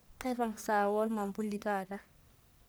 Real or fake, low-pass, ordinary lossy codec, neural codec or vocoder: fake; none; none; codec, 44.1 kHz, 1.7 kbps, Pupu-Codec